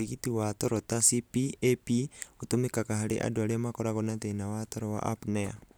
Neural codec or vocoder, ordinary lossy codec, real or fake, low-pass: none; none; real; none